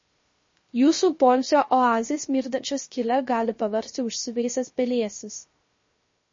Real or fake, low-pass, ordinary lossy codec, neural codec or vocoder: fake; 7.2 kHz; MP3, 32 kbps; codec, 16 kHz, 0.3 kbps, FocalCodec